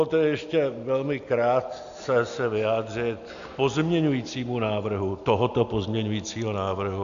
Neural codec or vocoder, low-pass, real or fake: none; 7.2 kHz; real